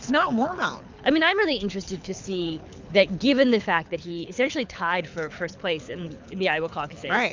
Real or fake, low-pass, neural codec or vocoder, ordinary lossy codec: fake; 7.2 kHz; codec, 24 kHz, 6 kbps, HILCodec; MP3, 64 kbps